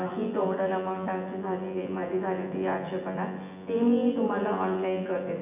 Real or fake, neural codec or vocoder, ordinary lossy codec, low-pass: fake; vocoder, 24 kHz, 100 mel bands, Vocos; AAC, 32 kbps; 3.6 kHz